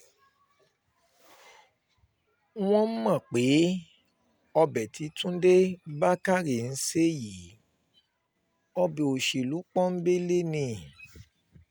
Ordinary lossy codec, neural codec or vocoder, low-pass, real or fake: none; none; none; real